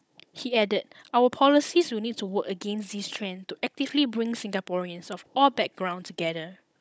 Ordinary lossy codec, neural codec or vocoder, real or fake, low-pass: none; codec, 16 kHz, 16 kbps, FunCodec, trained on Chinese and English, 50 frames a second; fake; none